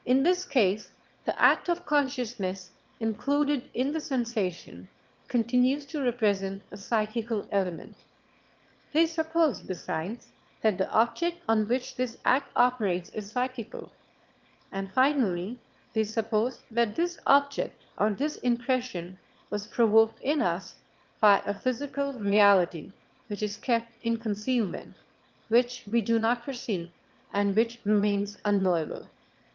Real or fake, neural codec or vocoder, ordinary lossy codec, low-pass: fake; autoencoder, 22.05 kHz, a latent of 192 numbers a frame, VITS, trained on one speaker; Opus, 32 kbps; 7.2 kHz